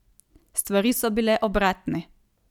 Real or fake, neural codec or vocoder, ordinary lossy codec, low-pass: real; none; none; 19.8 kHz